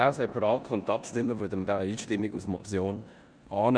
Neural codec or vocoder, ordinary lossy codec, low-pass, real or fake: codec, 16 kHz in and 24 kHz out, 0.9 kbps, LongCat-Audio-Codec, four codebook decoder; none; 9.9 kHz; fake